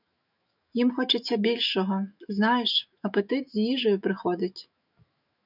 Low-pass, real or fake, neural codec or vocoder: 5.4 kHz; fake; autoencoder, 48 kHz, 128 numbers a frame, DAC-VAE, trained on Japanese speech